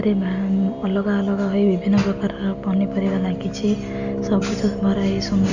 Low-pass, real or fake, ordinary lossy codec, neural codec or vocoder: 7.2 kHz; real; none; none